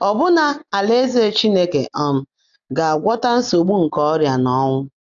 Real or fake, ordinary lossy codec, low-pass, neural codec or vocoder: real; none; 7.2 kHz; none